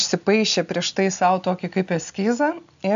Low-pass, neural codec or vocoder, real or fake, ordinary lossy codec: 7.2 kHz; none; real; AAC, 96 kbps